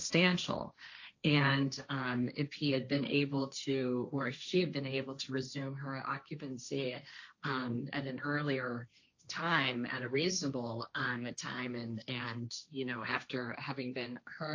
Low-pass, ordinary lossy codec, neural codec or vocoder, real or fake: 7.2 kHz; AAC, 48 kbps; codec, 16 kHz, 1.1 kbps, Voila-Tokenizer; fake